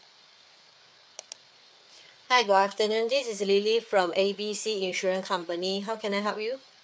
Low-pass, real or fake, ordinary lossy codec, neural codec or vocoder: none; fake; none; codec, 16 kHz, 4 kbps, FreqCodec, larger model